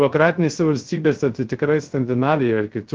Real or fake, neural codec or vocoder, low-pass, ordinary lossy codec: fake; codec, 16 kHz, 0.3 kbps, FocalCodec; 7.2 kHz; Opus, 16 kbps